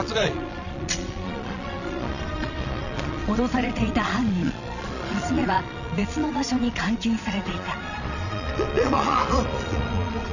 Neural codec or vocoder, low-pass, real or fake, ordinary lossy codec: vocoder, 22.05 kHz, 80 mel bands, Vocos; 7.2 kHz; fake; none